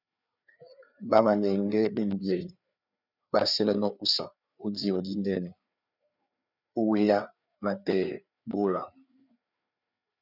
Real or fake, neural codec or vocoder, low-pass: fake; codec, 16 kHz, 4 kbps, FreqCodec, larger model; 5.4 kHz